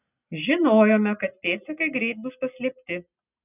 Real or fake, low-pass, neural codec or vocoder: real; 3.6 kHz; none